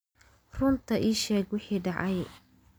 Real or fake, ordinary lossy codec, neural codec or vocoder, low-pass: real; none; none; none